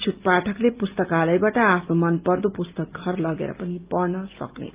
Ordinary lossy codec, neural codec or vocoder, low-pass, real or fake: Opus, 32 kbps; none; 3.6 kHz; real